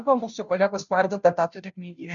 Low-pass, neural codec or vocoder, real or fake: 7.2 kHz; codec, 16 kHz, 0.5 kbps, FunCodec, trained on Chinese and English, 25 frames a second; fake